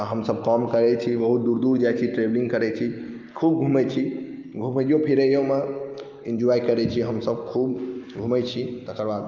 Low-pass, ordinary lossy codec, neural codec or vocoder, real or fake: 7.2 kHz; Opus, 24 kbps; none; real